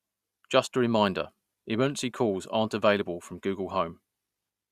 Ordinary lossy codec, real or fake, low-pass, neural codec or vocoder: Opus, 64 kbps; real; 14.4 kHz; none